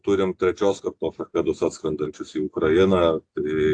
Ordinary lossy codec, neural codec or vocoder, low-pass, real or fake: AAC, 48 kbps; none; 9.9 kHz; real